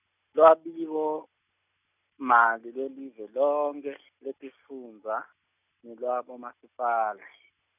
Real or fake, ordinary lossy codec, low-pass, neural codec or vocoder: real; none; 3.6 kHz; none